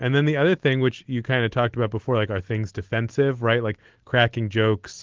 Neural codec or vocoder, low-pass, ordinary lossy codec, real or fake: none; 7.2 kHz; Opus, 16 kbps; real